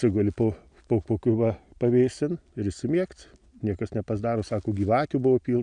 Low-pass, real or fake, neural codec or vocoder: 9.9 kHz; real; none